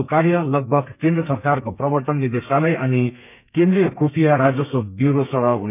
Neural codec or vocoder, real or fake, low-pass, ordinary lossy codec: codec, 32 kHz, 1.9 kbps, SNAC; fake; 3.6 kHz; none